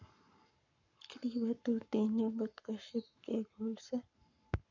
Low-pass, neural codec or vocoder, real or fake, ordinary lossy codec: 7.2 kHz; codec, 44.1 kHz, 7.8 kbps, Pupu-Codec; fake; none